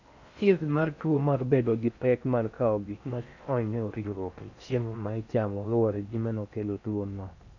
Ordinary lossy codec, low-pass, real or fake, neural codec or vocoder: none; 7.2 kHz; fake; codec, 16 kHz in and 24 kHz out, 0.6 kbps, FocalCodec, streaming, 4096 codes